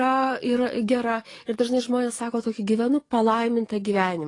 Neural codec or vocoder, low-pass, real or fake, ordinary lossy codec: codec, 44.1 kHz, 7.8 kbps, DAC; 10.8 kHz; fake; AAC, 32 kbps